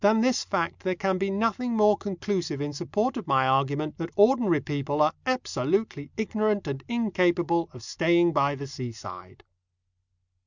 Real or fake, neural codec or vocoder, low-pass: real; none; 7.2 kHz